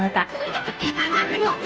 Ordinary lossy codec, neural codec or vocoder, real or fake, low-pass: none; codec, 16 kHz, 0.5 kbps, FunCodec, trained on Chinese and English, 25 frames a second; fake; none